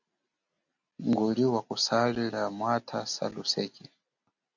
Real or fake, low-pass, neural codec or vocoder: real; 7.2 kHz; none